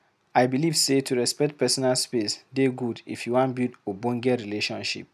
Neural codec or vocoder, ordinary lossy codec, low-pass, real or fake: none; none; 14.4 kHz; real